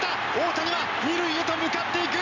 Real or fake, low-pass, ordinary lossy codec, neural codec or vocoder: real; 7.2 kHz; none; none